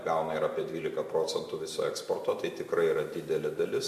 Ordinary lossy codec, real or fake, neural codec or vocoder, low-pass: MP3, 64 kbps; real; none; 14.4 kHz